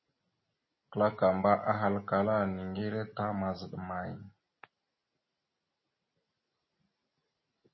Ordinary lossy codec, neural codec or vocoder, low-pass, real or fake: MP3, 24 kbps; none; 7.2 kHz; real